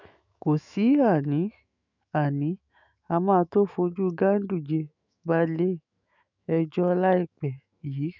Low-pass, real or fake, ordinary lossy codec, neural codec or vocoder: 7.2 kHz; fake; none; autoencoder, 48 kHz, 128 numbers a frame, DAC-VAE, trained on Japanese speech